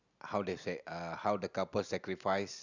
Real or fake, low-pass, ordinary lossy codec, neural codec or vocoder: real; 7.2 kHz; none; none